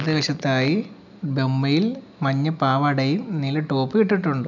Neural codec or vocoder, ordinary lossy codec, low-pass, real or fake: none; none; 7.2 kHz; real